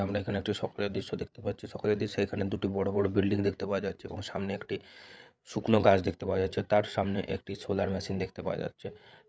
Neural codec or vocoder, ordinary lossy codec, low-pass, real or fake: codec, 16 kHz, 8 kbps, FreqCodec, larger model; none; none; fake